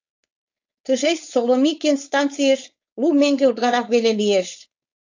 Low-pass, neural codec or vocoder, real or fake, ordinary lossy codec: 7.2 kHz; codec, 16 kHz, 4.8 kbps, FACodec; fake; AAC, 48 kbps